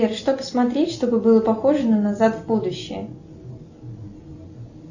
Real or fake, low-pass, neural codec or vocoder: real; 7.2 kHz; none